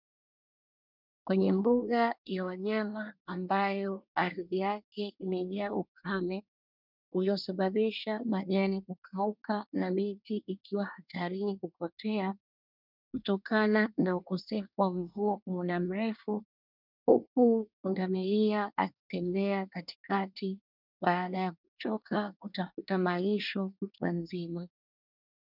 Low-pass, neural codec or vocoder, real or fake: 5.4 kHz; codec, 24 kHz, 1 kbps, SNAC; fake